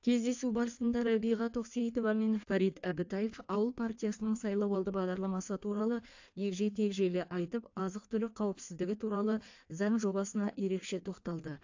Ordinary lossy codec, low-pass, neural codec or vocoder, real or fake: AAC, 48 kbps; 7.2 kHz; codec, 16 kHz in and 24 kHz out, 1.1 kbps, FireRedTTS-2 codec; fake